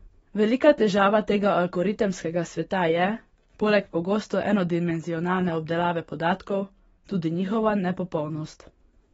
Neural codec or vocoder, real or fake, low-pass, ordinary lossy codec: codec, 44.1 kHz, 7.8 kbps, DAC; fake; 19.8 kHz; AAC, 24 kbps